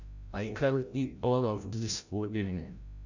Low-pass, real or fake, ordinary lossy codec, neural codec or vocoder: 7.2 kHz; fake; none; codec, 16 kHz, 0.5 kbps, FreqCodec, larger model